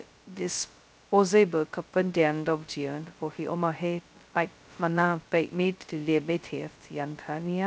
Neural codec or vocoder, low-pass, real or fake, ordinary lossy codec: codec, 16 kHz, 0.2 kbps, FocalCodec; none; fake; none